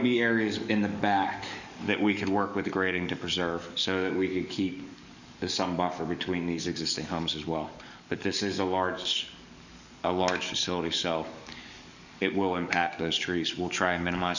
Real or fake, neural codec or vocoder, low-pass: fake; codec, 44.1 kHz, 7.8 kbps, DAC; 7.2 kHz